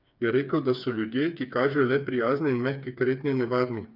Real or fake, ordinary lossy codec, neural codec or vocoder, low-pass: fake; none; codec, 16 kHz, 4 kbps, FreqCodec, smaller model; 5.4 kHz